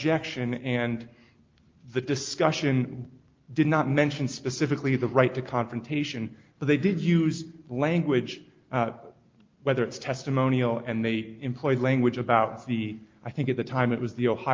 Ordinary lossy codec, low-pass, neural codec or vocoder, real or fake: Opus, 32 kbps; 7.2 kHz; none; real